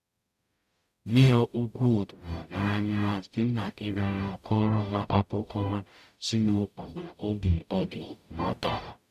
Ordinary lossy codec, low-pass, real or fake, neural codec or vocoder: none; 14.4 kHz; fake; codec, 44.1 kHz, 0.9 kbps, DAC